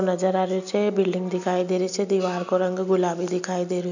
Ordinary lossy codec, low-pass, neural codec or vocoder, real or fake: none; 7.2 kHz; none; real